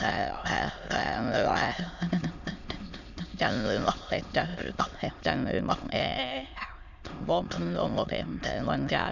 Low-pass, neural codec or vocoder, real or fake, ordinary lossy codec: 7.2 kHz; autoencoder, 22.05 kHz, a latent of 192 numbers a frame, VITS, trained on many speakers; fake; none